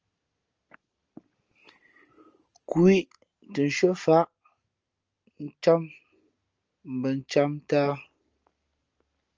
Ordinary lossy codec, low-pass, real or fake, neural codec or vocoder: Opus, 24 kbps; 7.2 kHz; real; none